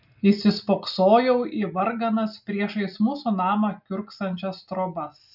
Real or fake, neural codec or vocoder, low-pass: real; none; 5.4 kHz